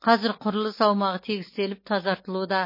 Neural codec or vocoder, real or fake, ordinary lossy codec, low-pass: none; real; MP3, 24 kbps; 5.4 kHz